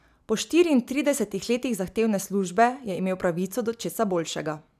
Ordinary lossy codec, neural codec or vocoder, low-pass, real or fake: none; none; 14.4 kHz; real